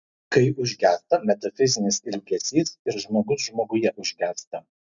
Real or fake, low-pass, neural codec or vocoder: real; 7.2 kHz; none